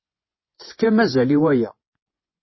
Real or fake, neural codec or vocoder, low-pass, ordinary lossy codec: fake; vocoder, 24 kHz, 100 mel bands, Vocos; 7.2 kHz; MP3, 24 kbps